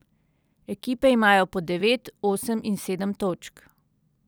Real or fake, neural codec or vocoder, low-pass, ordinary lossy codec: real; none; none; none